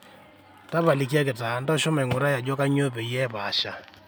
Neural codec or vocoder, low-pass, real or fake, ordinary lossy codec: none; none; real; none